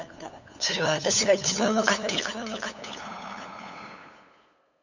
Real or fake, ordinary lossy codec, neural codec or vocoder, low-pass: fake; none; codec, 16 kHz, 8 kbps, FunCodec, trained on LibriTTS, 25 frames a second; 7.2 kHz